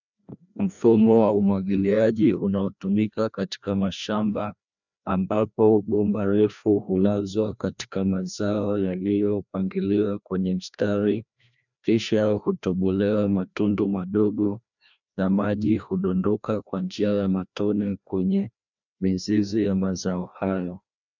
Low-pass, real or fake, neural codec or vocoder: 7.2 kHz; fake; codec, 16 kHz, 1 kbps, FreqCodec, larger model